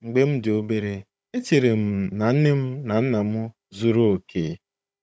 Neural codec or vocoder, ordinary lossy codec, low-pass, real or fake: codec, 16 kHz, 16 kbps, FunCodec, trained on Chinese and English, 50 frames a second; none; none; fake